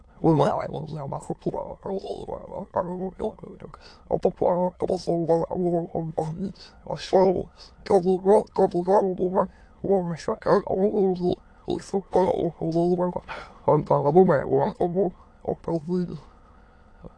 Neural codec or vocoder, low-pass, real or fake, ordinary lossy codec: autoencoder, 22.05 kHz, a latent of 192 numbers a frame, VITS, trained on many speakers; 9.9 kHz; fake; AAC, 48 kbps